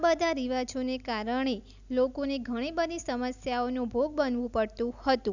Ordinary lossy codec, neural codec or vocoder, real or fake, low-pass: none; none; real; 7.2 kHz